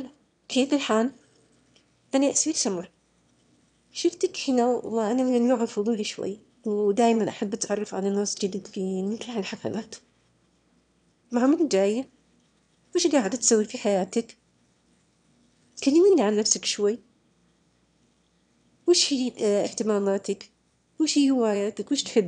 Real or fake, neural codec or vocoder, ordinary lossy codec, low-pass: fake; autoencoder, 22.05 kHz, a latent of 192 numbers a frame, VITS, trained on one speaker; none; 9.9 kHz